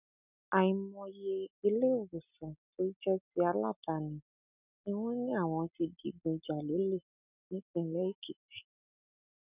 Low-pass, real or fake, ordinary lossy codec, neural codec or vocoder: 3.6 kHz; real; none; none